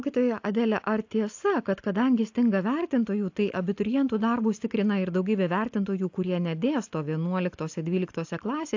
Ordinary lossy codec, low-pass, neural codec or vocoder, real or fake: AAC, 48 kbps; 7.2 kHz; none; real